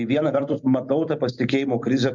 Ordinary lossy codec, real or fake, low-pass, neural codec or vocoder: MP3, 64 kbps; fake; 7.2 kHz; vocoder, 44.1 kHz, 128 mel bands every 256 samples, BigVGAN v2